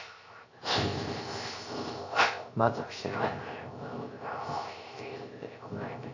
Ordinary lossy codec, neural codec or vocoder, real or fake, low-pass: AAC, 48 kbps; codec, 16 kHz, 0.3 kbps, FocalCodec; fake; 7.2 kHz